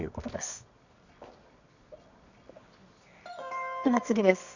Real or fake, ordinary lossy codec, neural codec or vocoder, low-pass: fake; none; codec, 24 kHz, 0.9 kbps, WavTokenizer, medium music audio release; 7.2 kHz